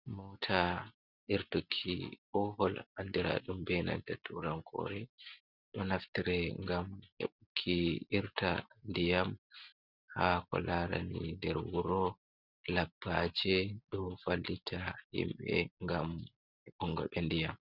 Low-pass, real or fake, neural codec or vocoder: 5.4 kHz; real; none